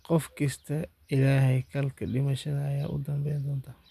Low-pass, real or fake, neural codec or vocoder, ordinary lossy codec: 14.4 kHz; real; none; AAC, 96 kbps